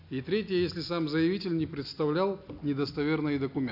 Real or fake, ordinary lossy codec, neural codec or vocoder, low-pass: real; AAC, 48 kbps; none; 5.4 kHz